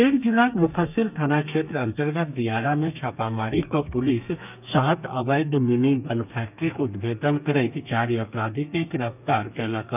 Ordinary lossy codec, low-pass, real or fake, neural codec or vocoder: none; 3.6 kHz; fake; codec, 32 kHz, 1.9 kbps, SNAC